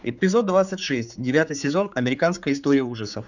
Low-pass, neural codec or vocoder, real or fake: 7.2 kHz; codec, 16 kHz, 4 kbps, X-Codec, HuBERT features, trained on general audio; fake